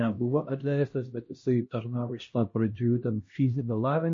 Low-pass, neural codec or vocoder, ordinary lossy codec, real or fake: 7.2 kHz; codec, 16 kHz, 1 kbps, X-Codec, HuBERT features, trained on LibriSpeech; MP3, 32 kbps; fake